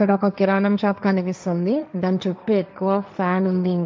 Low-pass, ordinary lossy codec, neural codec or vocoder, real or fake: none; none; codec, 16 kHz, 1.1 kbps, Voila-Tokenizer; fake